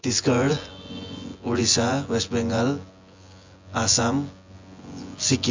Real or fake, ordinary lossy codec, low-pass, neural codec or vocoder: fake; MP3, 64 kbps; 7.2 kHz; vocoder, 24 kHz, 100 mel bands, Vocos